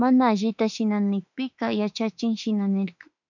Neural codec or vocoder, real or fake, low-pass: autoencoder, 48 kHz, 32 numbers a frame, DAC-VAE, trained on Japanese speech; fake; 7.2 kHz